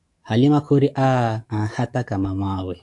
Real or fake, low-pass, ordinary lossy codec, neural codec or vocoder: fake; 10.8 kHz; AAC, 64 kbps; autoencoder, 48 kHz, 128 numbers a frame, DAC-VAE, trained on Japanese speech